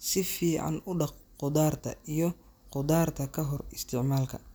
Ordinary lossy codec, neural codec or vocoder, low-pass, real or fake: none; none; none; real